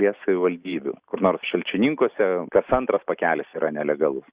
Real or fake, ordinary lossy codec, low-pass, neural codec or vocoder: real; Opus, 64 kbps; 3.6 kHz; none